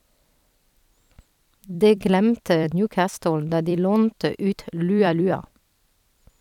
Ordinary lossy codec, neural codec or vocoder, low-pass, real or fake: none; vocoder, 44.1 kHz, 128 mel bands every 256 samples, BigVGAN v2; 19.8 kHz; fake